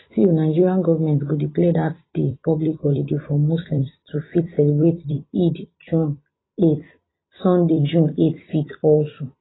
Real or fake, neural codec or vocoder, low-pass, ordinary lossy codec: fake; vocoder, 44.1 kHz, 128 mel bands every 256 samples, BigVGAN v2; 7.2 kHz; AAC, 16 kbps